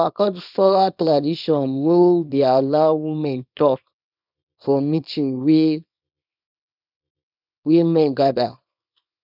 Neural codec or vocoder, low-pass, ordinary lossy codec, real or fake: codec, 24 kHz, 0.9 kbps, WavTokenizer, small release; 5.4 kHz; none; fake